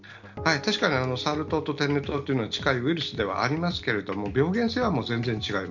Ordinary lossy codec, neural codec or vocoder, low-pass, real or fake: none; none; 7.2 kHz; real